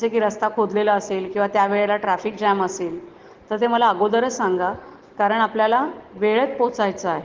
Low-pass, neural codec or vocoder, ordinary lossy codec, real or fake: 7.2 kHz; none; Opus, 16 kbps; real